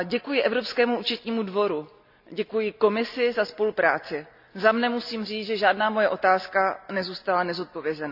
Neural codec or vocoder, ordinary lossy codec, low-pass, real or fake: none; none; 5.4 kHz; real